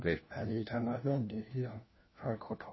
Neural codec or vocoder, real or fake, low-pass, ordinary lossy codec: codec, 16 kHz, 1 kbps, FunCodec, trained on LibriTTS, 50 frames a second; fake; 7.2 kHz; MP3, 24 kbps